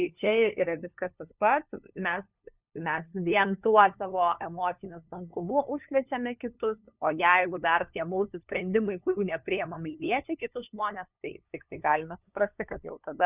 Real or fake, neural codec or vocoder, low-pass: fake; codec, 16 kHz, 2 kbps, FunCodec, trained on LibriTTS, 25 frames a second; 3.6 kHz